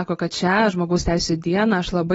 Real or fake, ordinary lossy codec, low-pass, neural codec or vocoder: real; AAC, 24 kbps; 19.8 kHz; none